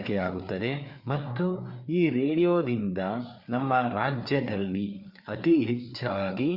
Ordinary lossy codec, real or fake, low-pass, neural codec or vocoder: Opus, 64 kbps; fake; 5.4 kHz; codec, 16 kHz, 4 kbps, FreqCodec, larger model